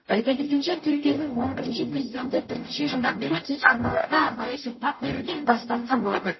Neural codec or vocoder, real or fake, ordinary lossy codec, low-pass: codec, 44.1 kHz, 0.9 kbps, DAC; fake; MP3, 24 kbps; 7.2 kHz